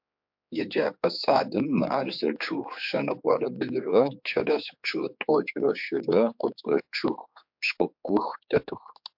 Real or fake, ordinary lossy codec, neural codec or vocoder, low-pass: fake; MP3, 48 kbps; codec, 16 kHz, 4 kbps, X-Codec, HuBERT features, trained on general audio; 5.4 kHz